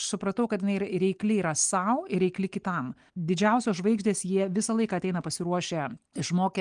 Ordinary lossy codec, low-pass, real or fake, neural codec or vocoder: Opus, 24 kbps; 9.9 kHz; real; none